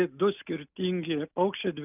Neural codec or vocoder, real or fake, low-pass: none; real; 3.6 kHz